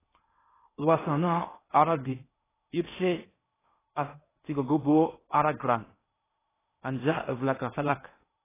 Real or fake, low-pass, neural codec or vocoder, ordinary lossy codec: fake; 3.6 kHz; codec, 16 kHz in and 24 kHz out, 0.8 kbps, FocalCodec, streaming, 65536 codes; AAC, 16 kbps